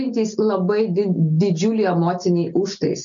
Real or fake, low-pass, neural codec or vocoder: real; 7.2 kHz; none